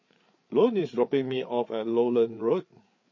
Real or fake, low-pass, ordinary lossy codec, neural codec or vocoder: fake; 7.2 kHz; MP3, 32 kbps; codec, 16 kHz, 8 kbps, FreqCodec, larger model